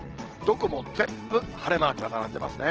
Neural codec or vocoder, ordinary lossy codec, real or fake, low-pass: vocoder, 22.05 kHz, 80 mel bands, WaveNeXt; Opus, 16 kbps; fake; 7.2 kHz